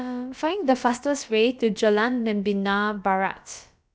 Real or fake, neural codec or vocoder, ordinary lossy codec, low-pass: fake; codec, 16 kHz, about 1 kbps, DyCAST, with the encoder's durations; none; none